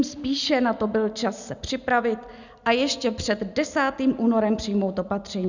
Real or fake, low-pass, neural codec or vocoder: real; 7.2 kHz; none